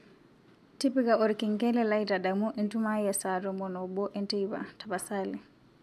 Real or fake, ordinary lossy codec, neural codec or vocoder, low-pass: real; none; none; 14.4 kHz